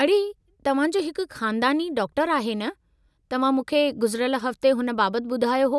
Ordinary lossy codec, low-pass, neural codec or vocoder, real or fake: none; none; none; real